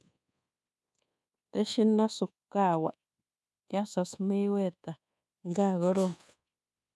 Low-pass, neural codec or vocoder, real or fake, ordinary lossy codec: none; codec, 24 kHz, 1.2 kbps, DualCodec; fake; none